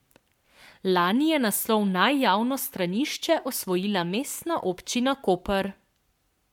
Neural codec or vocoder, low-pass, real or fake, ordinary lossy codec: codec, 44.1 kHz, 7.8 kbps, Pupu-Codec; 19.8 kHz; fake; MP3, 96 kbps